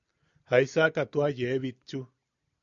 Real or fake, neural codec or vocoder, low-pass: real; none; 7.2 kHz